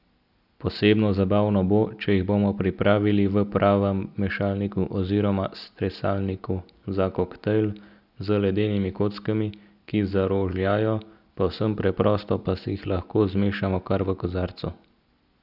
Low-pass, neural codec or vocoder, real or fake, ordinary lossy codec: 5.4 kHz; none; real; none